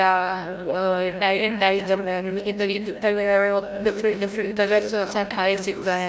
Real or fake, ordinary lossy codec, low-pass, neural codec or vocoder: fake; none; none; codec, 16 kHz, 0.5 kbps, FreqCodec, larger model